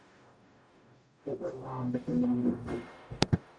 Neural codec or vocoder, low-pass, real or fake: codec, 44.1 kHz, 0.9 kbps, DAC; 9.9 kHz; fake